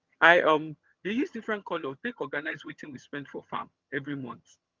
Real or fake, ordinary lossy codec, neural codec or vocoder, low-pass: fake; Opus, 24 kbps; vocoder, 22.05 kHz, 80 mel bands, HiFi-GAN; 7.2 kHz